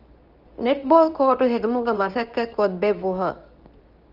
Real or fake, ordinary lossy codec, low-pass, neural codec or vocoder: fake; Opus, 24 kbps; 5.4 kHz; codec, 24 kHz, 0.9 kbps, WavTokenizer, medium speech release version 2